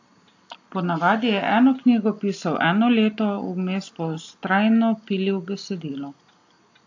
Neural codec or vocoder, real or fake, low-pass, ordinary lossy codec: none; real; 7.2 kHz; AAC, 48 kbps